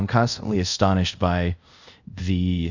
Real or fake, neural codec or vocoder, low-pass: fake; codec, 24 kHz, 0.5 kbps, DualCodec; 7.2 kHz